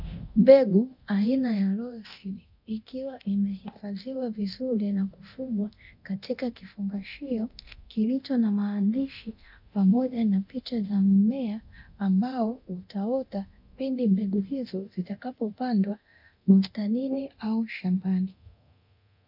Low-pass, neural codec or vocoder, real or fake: 5.4 kHz; codec, 24 kHz, 0.9 kbps, DualCodec; fake